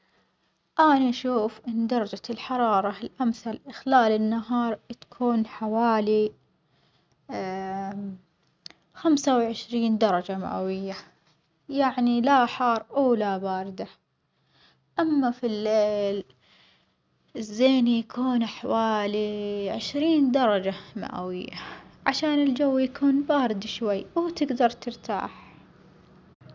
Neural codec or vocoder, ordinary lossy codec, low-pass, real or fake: none; none; none; real